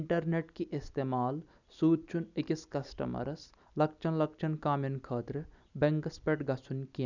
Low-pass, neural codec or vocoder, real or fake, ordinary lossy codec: 7.2 kHz; none; real; none